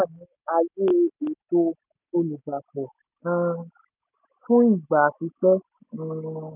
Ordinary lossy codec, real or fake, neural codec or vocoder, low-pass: none; real; none; 3.6 kHz